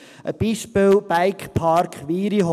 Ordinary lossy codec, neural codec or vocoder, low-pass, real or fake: none; none; 14.4 kHz; real